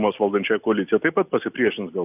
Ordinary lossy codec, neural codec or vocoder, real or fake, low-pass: AAC, 32 kbps; none; real; 3.6 kHz